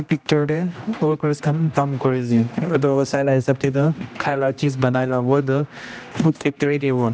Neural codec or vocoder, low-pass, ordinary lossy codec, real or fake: codec, 16 kHz, 1 kbps, X-Codec, HuBERT features, trained on general audio; none; none; fake